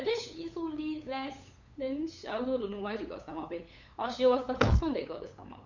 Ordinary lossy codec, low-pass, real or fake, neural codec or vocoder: none; 7.2 kHz; fake; codec, 16 kHz, 8 kbps, FunCodec, trained on LibriTTS, 25 frames a second